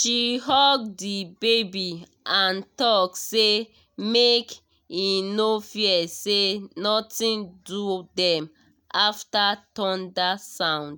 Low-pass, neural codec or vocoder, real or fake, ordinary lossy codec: none; none; real; none